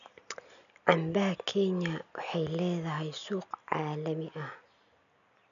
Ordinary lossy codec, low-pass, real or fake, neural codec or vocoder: none; 7.2 kHz; real; none